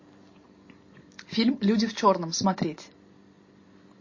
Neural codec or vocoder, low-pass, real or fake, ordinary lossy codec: none; 7.2 kHz; real; MP3, 32 kbps